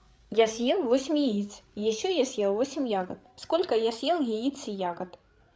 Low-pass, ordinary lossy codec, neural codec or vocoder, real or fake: none; none; codec, 16 kHz, 8 kbps, FreqCodec, larger model; fake